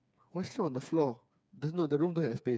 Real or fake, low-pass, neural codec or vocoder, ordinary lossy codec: fake; none; codec, 16 kHz, 4 kbps, FreqCodec, smaller model; none